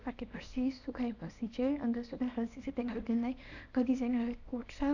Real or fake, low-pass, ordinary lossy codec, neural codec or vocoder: fake; 7.2 kHz; none; codec, 24 kHz, 0.9 kbps, WavTokenizer, small release